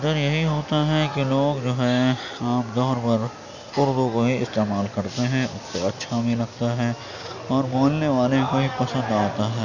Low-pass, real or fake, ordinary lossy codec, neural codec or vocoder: 7.2 kHz; real; none; none